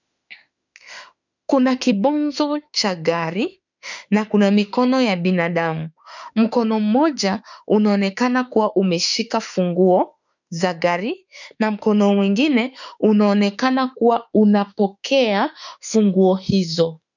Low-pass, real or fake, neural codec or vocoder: 7.2 kHz; fake; autoencoder, 48 kHz, 32 numbers a frame, DAC-VAE, trained on Japanese speech